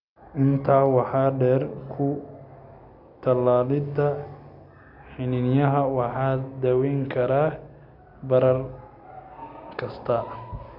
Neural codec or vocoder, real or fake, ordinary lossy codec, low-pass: none; real; none; 5.4 kHz